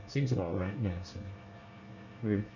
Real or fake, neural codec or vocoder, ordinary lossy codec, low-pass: fake; codec, 24 kHz, 1 kbps, SNAC; none; 7.2 kHz